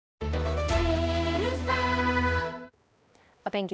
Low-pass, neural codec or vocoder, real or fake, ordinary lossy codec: none; codec, 16 kHz, 1 kbps, X-Codec, HuBERT features, trained on balanced general audio; fake; none